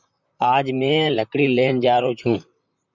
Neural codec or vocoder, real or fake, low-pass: vocoder, 44.1 kHz, 128 mel bands, Pupu-Vocoder; fake; 7.2 kHz